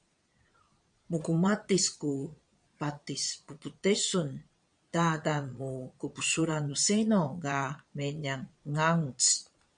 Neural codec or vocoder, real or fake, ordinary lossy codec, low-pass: vocoder, 22.05 kHz, 80 mel bands, Vocos; fake; MP3, 96 kbps; 9.9 kHz